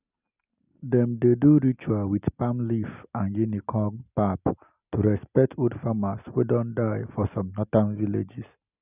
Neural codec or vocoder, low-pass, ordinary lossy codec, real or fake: none; 3.6 kHz; none; real